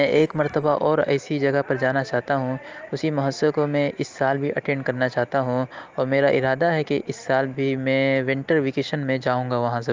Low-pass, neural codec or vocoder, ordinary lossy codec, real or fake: 7.2 kHz; none; Opus, 24 kbps; real